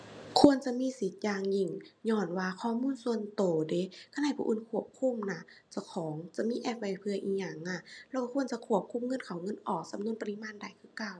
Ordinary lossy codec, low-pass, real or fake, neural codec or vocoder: none; none; real; none